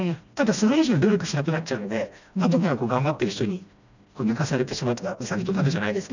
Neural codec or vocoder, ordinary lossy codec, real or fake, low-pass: codec, 16 kHz, 1 kbps, FreqCodec, smaller model; none; fake; 7.2 kHz